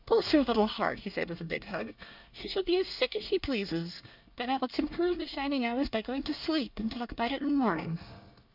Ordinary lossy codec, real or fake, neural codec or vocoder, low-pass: MP3, 48 kbps; fake; codec, 24 kHz, 1 kbps, SNAC; 5.4 kHz